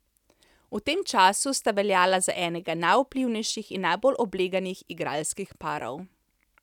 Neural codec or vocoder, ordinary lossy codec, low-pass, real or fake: none; none; 19.8 kHz; real